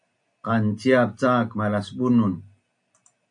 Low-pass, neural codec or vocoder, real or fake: 9.9 kHz; none; real